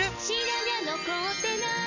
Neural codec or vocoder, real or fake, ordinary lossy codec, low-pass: none; real; none; 7.2 kHz